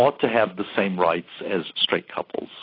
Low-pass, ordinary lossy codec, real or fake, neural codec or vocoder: 5.4 kHz; AAC, 24 kbps; real; none